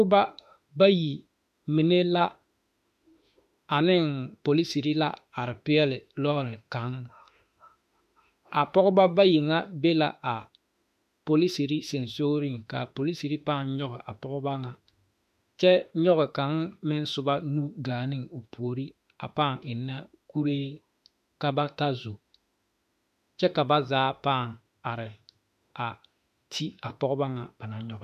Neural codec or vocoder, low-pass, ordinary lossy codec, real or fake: autoencoder, 48 kHz, 32 numbers a frame, DAC-VAE, trained on Japanese speech; 14.4 kHz; MP3, 96 kbps; fake